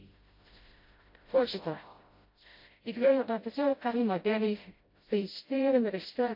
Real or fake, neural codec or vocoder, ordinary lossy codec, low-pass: fake; codec, 16 kHz, 0.5 kbps, FreqCodec, smaller model; MP3, 32 kbps; 5.4 kHz